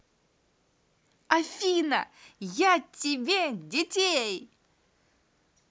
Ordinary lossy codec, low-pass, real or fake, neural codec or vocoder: none; none; real; none